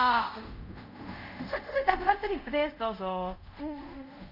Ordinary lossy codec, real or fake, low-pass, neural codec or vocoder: none; fake; 5.4 kHz; codec, 24 kHz, 0.5 kbps, DualCodec